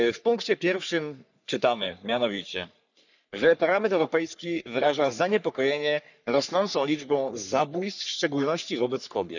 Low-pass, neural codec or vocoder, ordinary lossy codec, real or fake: 7.2 kHz; codec, 44.1 kHz, 3.4 kbps, Pupu-Codec; none; fake